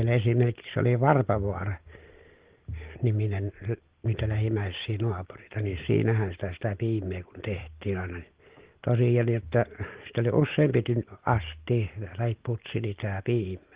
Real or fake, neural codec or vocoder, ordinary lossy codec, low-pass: real; none; Opus, 16 kbps; 3.6 kHz